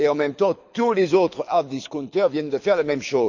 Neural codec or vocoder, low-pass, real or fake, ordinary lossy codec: codec, 24 kHz, 6 kbps, HILCodec; 7.2 kHz; fake; none